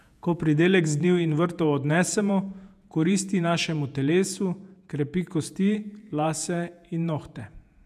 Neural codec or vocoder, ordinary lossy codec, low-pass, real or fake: vocoder, 48 kHz, 128 mel bands, Vocos; none; 14.4 kHz; fake